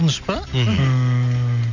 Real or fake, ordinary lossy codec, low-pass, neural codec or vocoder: real; none; 7.2 kHz; none